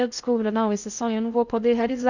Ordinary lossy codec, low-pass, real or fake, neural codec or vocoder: none; 7.2 kHz; fake; codec, 16 kHz in and 24 kHz out, 0.6 kbps, FocalCodec, streaming, 2048 codes